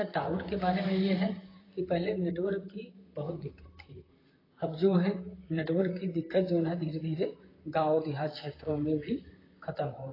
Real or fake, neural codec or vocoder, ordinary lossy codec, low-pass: fake; vocoder, 44.1 kHz, 128 mel bands, Pupu-Vocoder; AAC, 24 kbps; 5.4 kHz